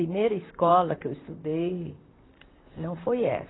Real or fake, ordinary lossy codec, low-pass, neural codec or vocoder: fake; AAC, 16 kbps; 7.2 kHz; vocoder, 44.1 kHz, 128 mel bands, Pupu-Vocoder